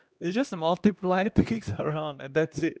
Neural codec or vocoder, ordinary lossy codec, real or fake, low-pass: codec, 16 kHz, 1 kbps, X-Codec, HuBERT features, trained on LibriSpeech; none; fake; none